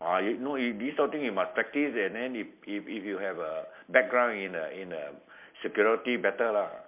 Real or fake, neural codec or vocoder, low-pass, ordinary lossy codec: real; none; 3.6 kHz; MP3, 32 kbps